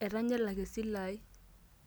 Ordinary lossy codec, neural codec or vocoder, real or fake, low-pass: none; none; real; none